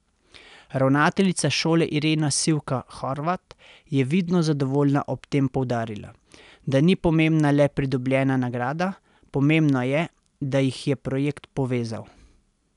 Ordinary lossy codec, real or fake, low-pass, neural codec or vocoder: none; real; 10.8 kHz; none